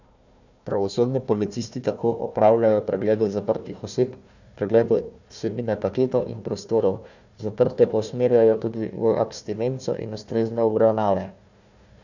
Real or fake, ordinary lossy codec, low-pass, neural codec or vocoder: fake; none; 7.2 kHz; codec, 16 kHz, 1 kbps, FunCodec, trained on Chinese and English, 50 frames a second